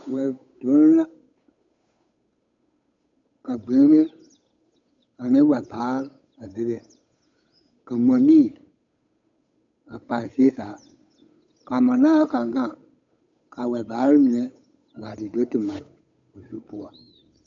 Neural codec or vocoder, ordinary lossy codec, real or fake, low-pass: codec, 16 kHz, 8 kbps, FunCodec, trained on Chinese and English, 25 frames a second; MP3, 48 kbps; fake; 7.2 kHz